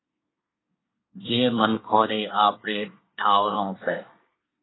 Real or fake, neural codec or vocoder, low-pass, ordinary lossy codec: fake; codec, 24 kHz, 1 kbps, SNAC; 7.2 kHz; AAC, 16 kbps